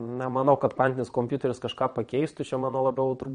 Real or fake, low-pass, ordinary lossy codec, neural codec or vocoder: fake; 9.9 kHz; MP3, 48 kbps; vocoder, 22.05 kHz, 80 mel bands, Vocos